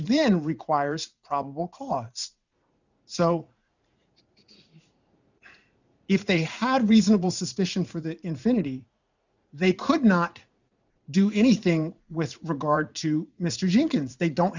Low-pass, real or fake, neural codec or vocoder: 7.2 kHz; fake; vocoder, 44.1 kHz, 80 mel bands, Vocos